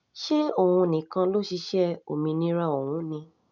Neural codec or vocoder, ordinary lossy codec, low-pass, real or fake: none; none; 7.2 kHz; real